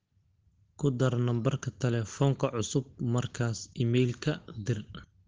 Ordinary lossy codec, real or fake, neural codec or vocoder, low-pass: Opus, 16 kbps; real; none; 7.2 kHz